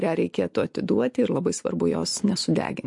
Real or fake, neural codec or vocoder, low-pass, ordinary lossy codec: real; none; 10.8 kHz; MP3, 48 kbps